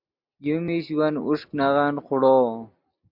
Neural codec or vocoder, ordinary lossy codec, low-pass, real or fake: none; MP3, 48 kbps; 5.4 kHz; real